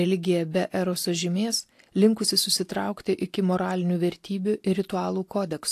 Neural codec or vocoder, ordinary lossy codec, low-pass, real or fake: none; AAC, 64 kbps; 14.4 kHz; real